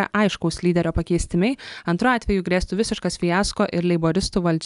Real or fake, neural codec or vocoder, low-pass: real; none; 10.8 kHz